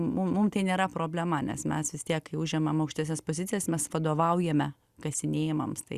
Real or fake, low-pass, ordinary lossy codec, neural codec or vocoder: real; 14.4 kHz; Opus, 64 kbps; none